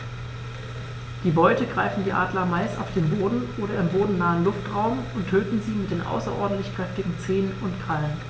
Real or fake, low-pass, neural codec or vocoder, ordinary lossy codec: real; none; none; none